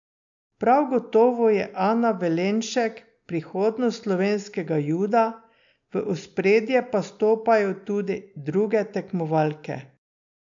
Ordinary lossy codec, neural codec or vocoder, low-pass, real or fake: AAC, 64 kbps; none; 7.2 kHz; real